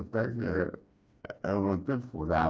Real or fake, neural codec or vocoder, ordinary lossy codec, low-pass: fake; codec, 16 kHz, 2 kbps, FreqCodec, smaller model; none; none